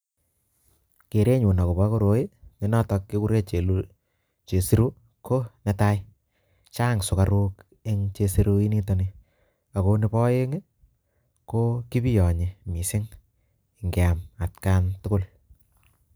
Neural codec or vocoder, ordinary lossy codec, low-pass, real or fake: none; none; none; real